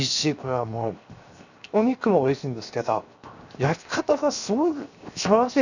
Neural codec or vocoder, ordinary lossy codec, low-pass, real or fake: codec, 16 kHz, 0.7 kbps, FocalCodec; none; 7.2 kHz; fake